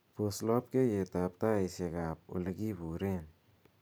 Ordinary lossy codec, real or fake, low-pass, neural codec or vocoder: none; fake; none; vocoder, 44.1 kHz, 128 mel bands every 512 samples, BigVGAN v2